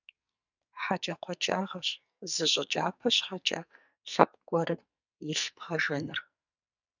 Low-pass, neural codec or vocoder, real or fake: 7.2 kHz; codec, 44.1 kHz, 2.6 kbps, SNAC; fake